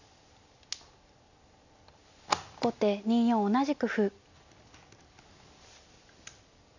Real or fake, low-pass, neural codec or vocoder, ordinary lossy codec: real; 7.2 kHz; none; none